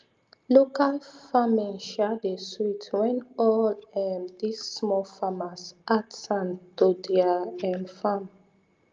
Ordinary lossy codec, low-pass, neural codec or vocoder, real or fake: Opus, 24 kbps; 7.2 kHz; none; real